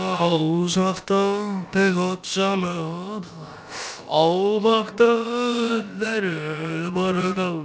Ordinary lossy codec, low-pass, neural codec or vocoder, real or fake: none; none; codec, 16 kHz, about 1 kbps, DyCAST, with the encoder's durations; fake